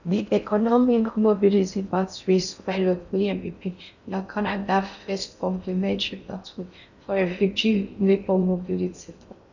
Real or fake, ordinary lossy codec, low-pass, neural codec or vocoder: fake; none; 7.2 kHz; codec, 16 kHz in and 24 kHz out, 0.6 kbps, FocalCodec, streaming, 4096 codes